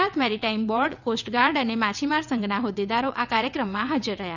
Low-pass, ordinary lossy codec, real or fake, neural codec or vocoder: 7.2 kHz; none; fake; vocoder, 22.05 kHz, 80 mel bands, WaveNeXt